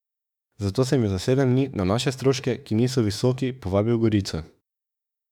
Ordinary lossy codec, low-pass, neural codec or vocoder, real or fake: none; 19.8 kHz; autoencoder, 48 kHz, 32 numbers a frame, DAC-VAE, trained on Japanese speech; fake